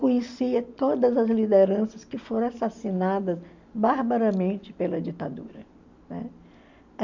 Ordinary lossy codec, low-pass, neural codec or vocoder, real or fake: MP3, 64 kbps; 7.2 kHz; none; real